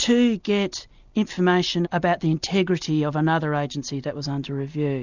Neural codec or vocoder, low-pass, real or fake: none; 7.2 kHz; real